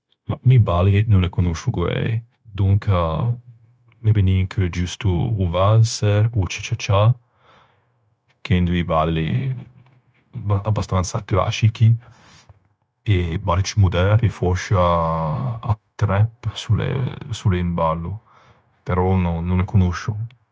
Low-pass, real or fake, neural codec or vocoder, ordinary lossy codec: none; fake; codec, 16 kHz, 0.9 kbps, LongCat-Audio-Codec; none